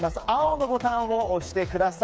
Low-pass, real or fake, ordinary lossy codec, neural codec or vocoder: none; fake; none; codec, 16 kHz, 4 kbps, FreqCodec, smaller model